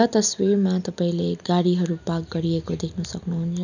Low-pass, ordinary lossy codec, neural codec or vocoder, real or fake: 7.2 kHz; none; none; real